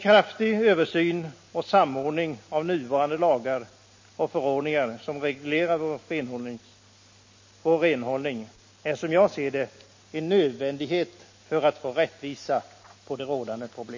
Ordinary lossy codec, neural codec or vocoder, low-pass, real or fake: MP3, 32 kbps; none; 7.2 kHz; real